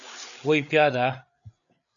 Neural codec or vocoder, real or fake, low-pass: codec, 16 kHz, 8 kbps, FreqCodec, larger model; fake; 7.2 kHz